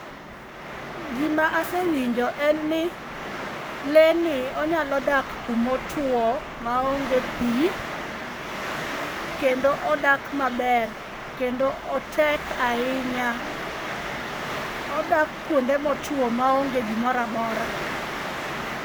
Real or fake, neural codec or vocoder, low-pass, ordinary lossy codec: fake; codec, 44.1 kHz, 7.8 kbps, Pupu-Codec; none; none